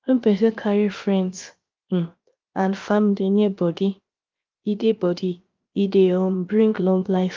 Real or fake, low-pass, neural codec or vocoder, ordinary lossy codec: fake; 7.2 kHz; codec, 16 kHz, 0.7 kbps, FocalCodec; Opus, 32 kbps